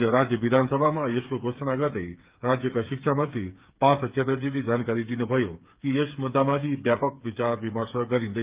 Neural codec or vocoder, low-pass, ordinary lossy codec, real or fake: codec, 16 kHz, 8 kbps, FreqCodec, smaller model; 3.6 kHz; Opus, 32 kbps; fake